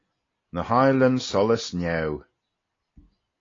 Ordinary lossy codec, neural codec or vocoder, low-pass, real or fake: AAC, 32 kbps; none; 7.2 kHz; real